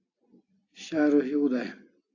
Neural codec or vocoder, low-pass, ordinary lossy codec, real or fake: none; 7.2 kHz; AAC, 32 kbps; real